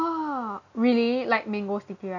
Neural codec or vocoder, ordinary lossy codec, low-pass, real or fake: none; none; 7.2 kHz; real